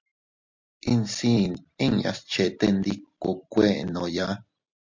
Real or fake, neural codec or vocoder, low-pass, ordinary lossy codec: real; none; 7.2 kHz; MP3, 48 kbps